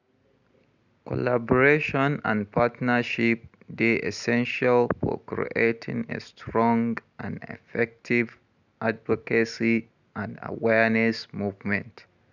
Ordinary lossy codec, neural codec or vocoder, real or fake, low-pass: none; none; real; 7.2 kHz